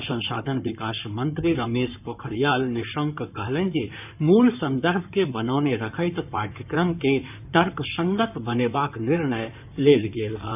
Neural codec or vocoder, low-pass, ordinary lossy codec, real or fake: codec, 16 kHz in and 24 kHz out, 2.2 kbps, FireRedTTS-2 codec; 3.6 kHz; none; fake